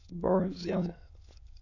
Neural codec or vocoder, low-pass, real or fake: autoencoder, 22.05 kHz, a latent of 192 numbers a frame, VITS, trained on many speakers; 7.2 kHz; fake